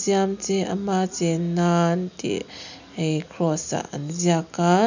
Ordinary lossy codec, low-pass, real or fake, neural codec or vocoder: none; 7.2 kHz; real; none